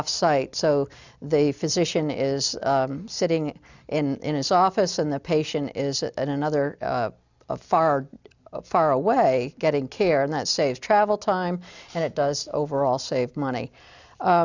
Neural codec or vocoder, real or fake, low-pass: none; real; 7.2 kHz